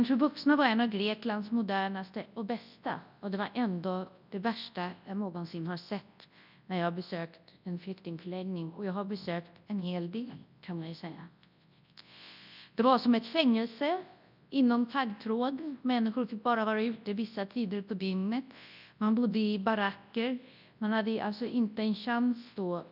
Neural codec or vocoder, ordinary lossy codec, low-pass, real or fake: codec, 24 kHz, 0.9 kbps, WavTokenizer, large speech release; none; 5.4 kHz; fake